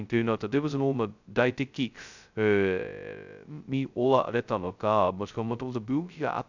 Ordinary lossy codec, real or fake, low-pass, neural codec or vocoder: none; fake; 7.2 kHz; codec, 16 kHz, 0.2 kbps, FocalCodec